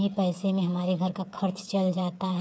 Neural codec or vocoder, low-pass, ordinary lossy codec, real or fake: codec, 16 kHz, 8 kbps, FreqCodec, smaller model; none; none; fake